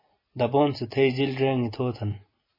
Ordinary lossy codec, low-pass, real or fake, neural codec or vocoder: MP3, 24 kbps; 5.4 kHz; real; none